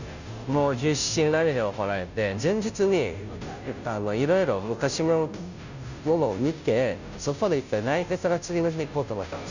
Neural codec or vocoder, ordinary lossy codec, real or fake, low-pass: codec, 16 kHz, 0.5 kbps, FunCodec, trained on Chinese and English, 25 frames a second; none; fake; 7.2 kHz